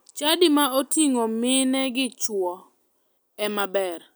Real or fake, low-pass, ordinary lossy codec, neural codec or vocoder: real; none; none; none